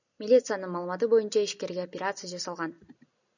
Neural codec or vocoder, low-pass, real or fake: none; 7.2 kHz; real